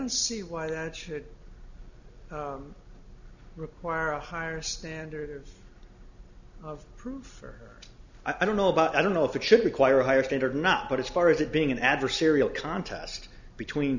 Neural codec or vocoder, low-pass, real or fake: none; 7.2 kHz; real